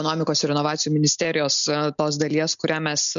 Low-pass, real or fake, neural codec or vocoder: 7.2 kHz; real; none